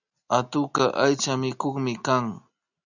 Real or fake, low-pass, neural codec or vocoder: real; 7.2 kHz; none